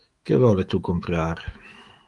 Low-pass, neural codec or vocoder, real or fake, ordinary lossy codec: 10.8 kHz; autoencoder, 48 kHz, 128 numbers a frame, DAC-VAE, trained on Japanese speech; fake; Opus, 32 kbps